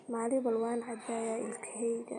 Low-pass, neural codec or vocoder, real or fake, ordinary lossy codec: 19.8 kHz; none; real; MP3, 48 kbps